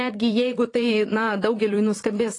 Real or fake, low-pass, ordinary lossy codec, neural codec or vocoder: fake; 10.8 kHz; AAC, 32 kbps; vocoder, 44.1 kHz, 128 mel bands, Pupu-Vocoder